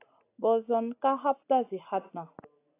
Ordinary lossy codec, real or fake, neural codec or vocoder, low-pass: AAC, 24 kbps; real; none; 3.6 kHz